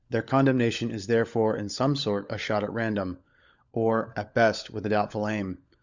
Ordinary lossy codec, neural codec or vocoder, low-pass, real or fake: Opus, 64 kbps; codec, 16 kHz, 16 kbps, FunCodec, trained on LibriTTS, 50 frames a second; 7.2 kHz; fake